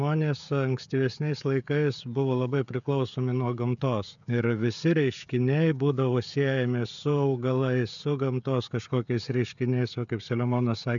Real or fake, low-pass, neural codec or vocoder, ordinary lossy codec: fake; 7.2 kHz; codec, 16 kHz, 16 kbps, FreqCodec, smaller model; MP3, 96 kbps